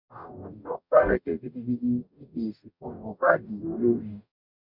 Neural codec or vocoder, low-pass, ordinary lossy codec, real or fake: codec, 44.1 kHz, 0.9 kbps, DAC; 5.4 kHz; none; fake